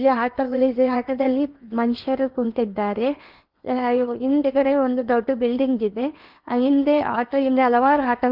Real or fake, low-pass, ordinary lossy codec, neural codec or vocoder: fake; 5.4 kHz; Opus, 24 kbps; codec, 16 kHz in and 24 kHz out, 0.8 kbps, FocalCodec, streaming, 65536 codes